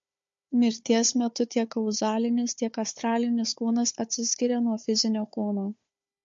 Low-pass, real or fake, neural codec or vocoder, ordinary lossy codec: 7.2 kHz; fake; codec, 16 kHz, 4 kbps, FunCodec, trained on Chinese and English, 50 frames a second; MP3, 48 kbps